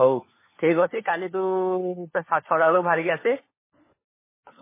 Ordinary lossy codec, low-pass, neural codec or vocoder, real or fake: MP3, 16 kbps; 3.6 kHz; codec, 16 kHz, 4 kbps, FunCodec, trained on LibriTTS, 50 frames a second; fake